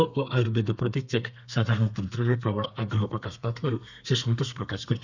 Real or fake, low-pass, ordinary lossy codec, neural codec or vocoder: fake; 7.2 kHz; none; codec, 32 kHz, 1.9 kbps, SNAC